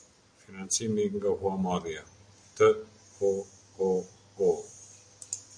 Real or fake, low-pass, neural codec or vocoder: real; 9.9 kHz; none